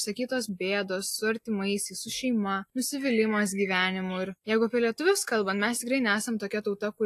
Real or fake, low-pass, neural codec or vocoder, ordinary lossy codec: real; 14.4 kHz; none; AAC, 64 kbps